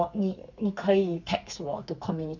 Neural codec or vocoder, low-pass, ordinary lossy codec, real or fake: codec, 32 kHz, 1.9 kbps, SNAC; 7.2 kHz; none; fake